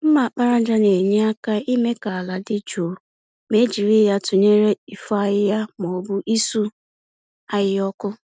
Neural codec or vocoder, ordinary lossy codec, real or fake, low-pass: none; none; real; none